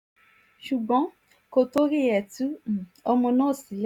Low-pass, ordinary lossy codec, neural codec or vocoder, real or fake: 19.8 kHz; none; none; real